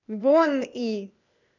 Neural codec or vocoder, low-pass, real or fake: codec, 16 kHz, 0.8 kbps, ZipCodec; 7.2 kHz; fake